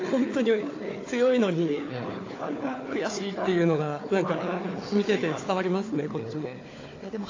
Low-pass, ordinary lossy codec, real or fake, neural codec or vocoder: 7.2 kHz; AAC, 32 kbps; fake; codec, 16 kHz, 4 kbps, FunCodec, trained on Chinese and English, 50 frames a second